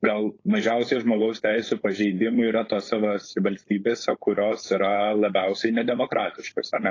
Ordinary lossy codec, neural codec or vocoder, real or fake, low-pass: AAC, 32 kbps; codec, 16 kHz, 4.8 kbps, FACodec; fake; 7.2 kHz